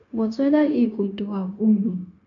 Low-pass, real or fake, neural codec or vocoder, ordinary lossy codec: 7.2 kHz; fake; codec, 16 kHz, 0.9 kbps, LongCat-Audio-Codec; none